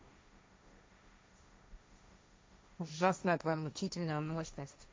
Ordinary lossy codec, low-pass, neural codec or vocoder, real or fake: none; none; codec, 16 kHz, 1.1 kbps, Voila-Tokenizer; fake